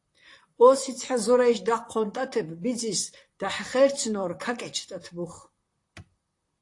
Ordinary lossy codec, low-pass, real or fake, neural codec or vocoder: AAC, 48 kbps; 10.8 kHz; fake; vocoder, 44.1 kHz, 128 mel bands, Pupu-Vocoder